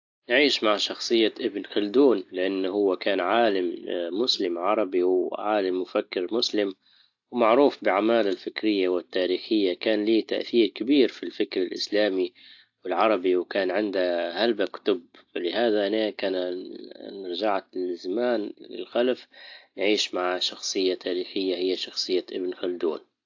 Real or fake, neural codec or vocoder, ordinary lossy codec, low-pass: real; none; AAC, 48 kbps; 7.2 kHz